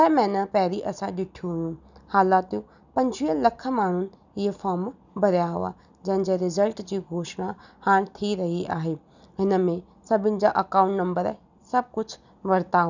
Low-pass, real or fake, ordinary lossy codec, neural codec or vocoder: 7.2 kHz; real; none; none